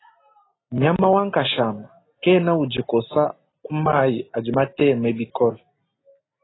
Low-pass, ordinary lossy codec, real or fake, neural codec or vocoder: 7.2 kHz; AAC, 16 kbps; real; none